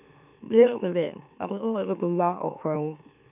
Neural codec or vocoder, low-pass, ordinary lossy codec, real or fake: autoencoder, 44.1 kHz, a latent of 192 numbers a frame, MeloTTS; 3.6 kHz; none; fake